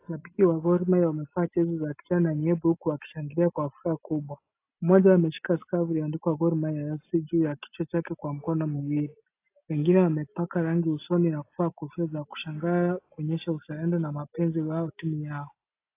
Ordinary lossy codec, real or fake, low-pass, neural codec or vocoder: AAC, 24 kbps; real; 3.6 kHz; none